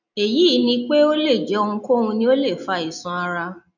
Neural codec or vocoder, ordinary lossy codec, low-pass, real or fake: none; none; 7.2 kHz; real